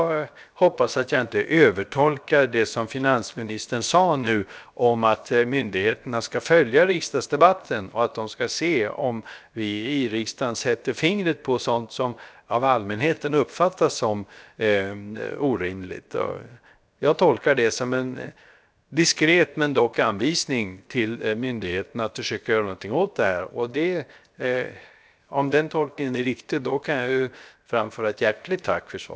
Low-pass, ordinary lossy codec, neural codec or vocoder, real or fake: none; none; codec, 16 kHz, 0.7 kbps, FocalCodec; fake